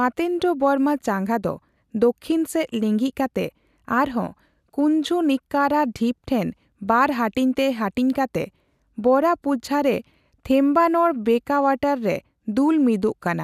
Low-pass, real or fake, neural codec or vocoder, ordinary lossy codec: 14.4 kHz; real; none; none